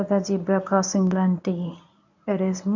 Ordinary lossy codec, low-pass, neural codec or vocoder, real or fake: none; 7.2 kHz; codec, 24 kHz, 0.9 kbps, WavTokenizer, medium speech release version 1; fake